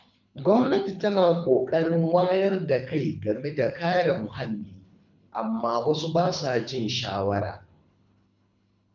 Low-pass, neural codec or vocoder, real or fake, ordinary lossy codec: 7.2 kHz; codec, 24 kHz, 3 kbps, HILCodec; fake; none